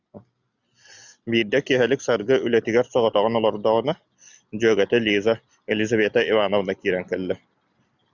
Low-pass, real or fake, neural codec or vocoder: 7.2 kHz; real; none